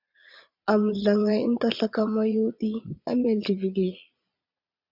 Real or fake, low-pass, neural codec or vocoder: fake; 5.4 kHz; vocoder, 22.05 kHz, 80 mel bands, Vocos